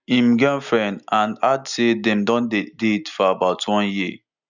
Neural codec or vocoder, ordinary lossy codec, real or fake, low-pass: none; none; real; 7.2 kHz